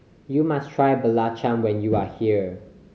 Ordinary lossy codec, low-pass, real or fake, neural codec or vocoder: none; none; real; none